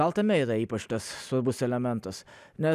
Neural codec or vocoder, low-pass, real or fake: none; 14.4 kHz; real